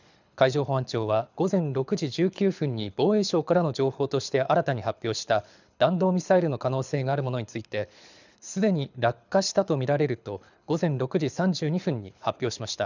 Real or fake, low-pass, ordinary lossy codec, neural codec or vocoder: fake; 7.2 kHz; none; codec, 24 kHz, 6 kbps, HILCodec